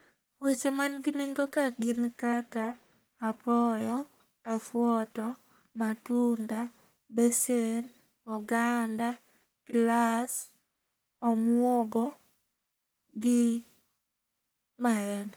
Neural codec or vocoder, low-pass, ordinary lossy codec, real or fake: codec, 44.1 kHz, 1.7 kbps, Pupu-Codec; none; none; fake